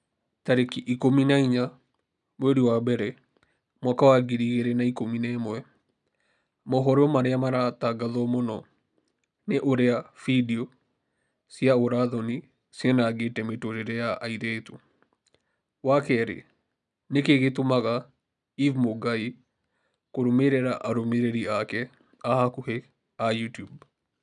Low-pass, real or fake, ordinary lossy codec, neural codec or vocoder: 10.8 kHz; real; none; none